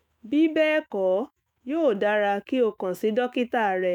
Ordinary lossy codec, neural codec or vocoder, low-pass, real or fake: none; autoencoder, 48 kHz, 128 numbers a frame, DAC-VAE, trained on Japanese speech; 19.8 kHz; fake